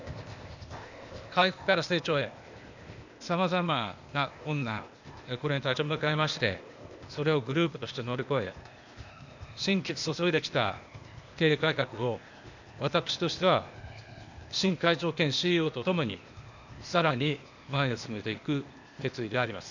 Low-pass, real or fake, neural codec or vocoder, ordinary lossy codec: 7.2 kHz; fake; codec, 16 kHz, 0.8 kbps, ZipCodec; none